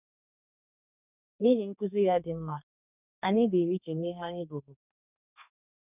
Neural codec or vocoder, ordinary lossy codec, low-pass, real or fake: codec, 32 kHz, 1.9 kbps, SNAC; none; 3.6 kHz; fake